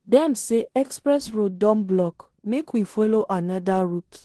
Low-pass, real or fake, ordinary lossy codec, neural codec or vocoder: 10.8 kHz; fake; Opus, 16 kbps; codec, 16 kHz in and 24 kHz out, 0.9 kbps, LongCat-Audio-Codec, four codebook decoder